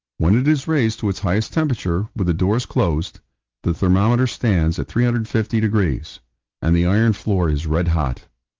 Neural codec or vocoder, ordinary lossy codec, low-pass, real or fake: none; Opus, 32 kbps; 7.2 kHz; real